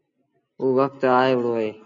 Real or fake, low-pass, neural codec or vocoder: real; 7.2 kHz; none